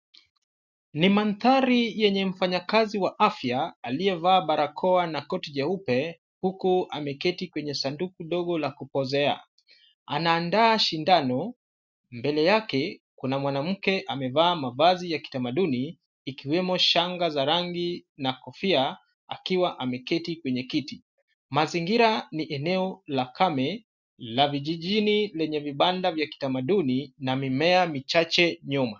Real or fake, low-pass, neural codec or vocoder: real; 7.2 kHz; none